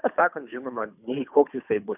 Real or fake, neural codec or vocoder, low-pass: fake; codec, 24 kHz, 3 kbps, HILCodec; 3.6 kHz